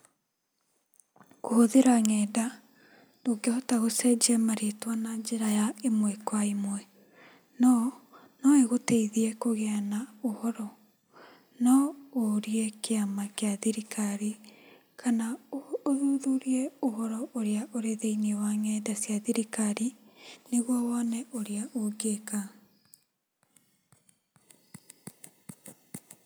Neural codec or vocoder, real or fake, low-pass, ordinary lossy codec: none; real; none; none